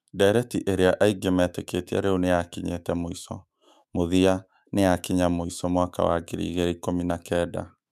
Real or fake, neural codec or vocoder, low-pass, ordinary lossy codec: fake; autoencoder, 48 kHz, 128 numbers a frame, DAC-VAE, trained on Japanese speech; 14.4 kHz; none